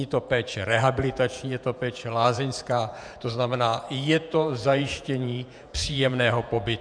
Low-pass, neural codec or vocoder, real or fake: 9.9 kHz; vocoder, 48 kHz, 128 mel bands, Vocos; fake